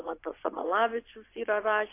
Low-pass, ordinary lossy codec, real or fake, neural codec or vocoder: 3.6 kHz; AAC, 24 kbps; fake; vocoder, 24 kHz, 100 mel bands, Vocos